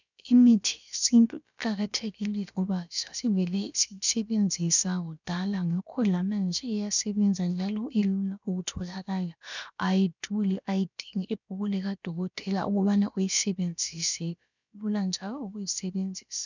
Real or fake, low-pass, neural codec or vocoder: fake; 7.2 kHz; codec, 16 kHz, about 1 kbps, DyCAST, with the encoder's durations